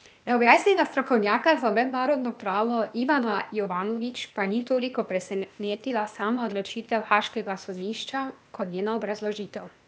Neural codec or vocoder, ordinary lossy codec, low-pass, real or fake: codec, 16 kHz, 0.8 kbps, ZipCodec; none; none; fake